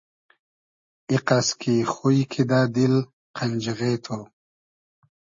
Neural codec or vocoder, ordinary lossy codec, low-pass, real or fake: none; MP3, 32 kbps; 7.2 kHz; real